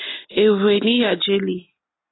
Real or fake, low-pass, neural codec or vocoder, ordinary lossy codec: real; 7.2 kHz; none; AAC, 16 kbps